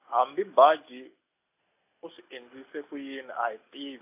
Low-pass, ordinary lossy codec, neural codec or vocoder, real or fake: 3.6 kHz; AAC, 32 kbps; none; real